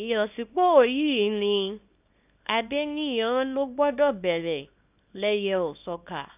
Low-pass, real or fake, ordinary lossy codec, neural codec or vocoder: 3.6 kHz; fake; none; codec, 24 kHz, 0.9 kbps, WavTokenizer, small release